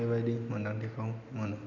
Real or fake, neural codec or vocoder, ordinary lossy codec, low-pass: real; none; none; 7.2 kHz